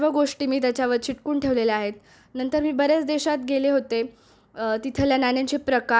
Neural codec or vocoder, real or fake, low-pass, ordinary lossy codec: none; real; none; none